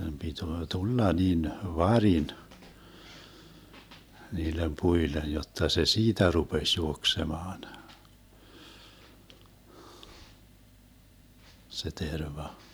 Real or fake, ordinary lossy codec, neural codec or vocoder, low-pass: fake; none; vocoder, 44.1 kHz, 128 mel bands every 512 samples, BigVGAN v2; none